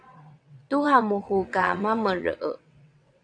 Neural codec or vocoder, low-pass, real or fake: vocoder, 22.05 kHz, 80 mel bands, WaveNeXt; 9.9 kHz; fake